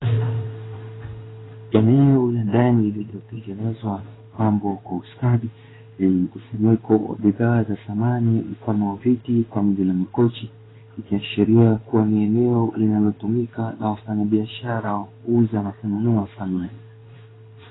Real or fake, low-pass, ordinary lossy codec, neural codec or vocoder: fake; 7.2 kHz; AAC, 16 kbps; codec, 16 kHz in and 24 kHz out, 1 kbps, XY-Tokenizer